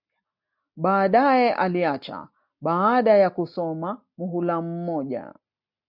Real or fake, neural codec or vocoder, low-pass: real; none; 5.4 kHz